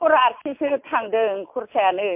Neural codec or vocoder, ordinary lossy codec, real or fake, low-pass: none; MP3, 32 kbps; real; 3.6 kHz